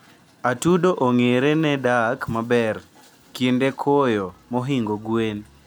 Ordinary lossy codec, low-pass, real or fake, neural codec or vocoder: none; none; real; none